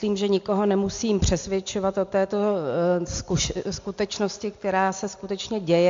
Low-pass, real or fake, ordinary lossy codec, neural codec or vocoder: 7.2 kHz; real; MP3, 48 kbps; none